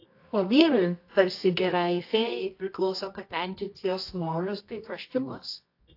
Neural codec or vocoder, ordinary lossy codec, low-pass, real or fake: codec, 24 kHz, 0.9 kbps, WavTokenizer, medium music audio release; AAC, 32 kbps; 5.4 kHz; fake